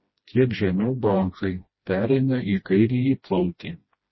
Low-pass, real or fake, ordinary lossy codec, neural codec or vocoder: 7.2 kHz; fake; MP3, 24 kbps; codec, 16 kHz, 1 kbps, FreqCodec, smaller model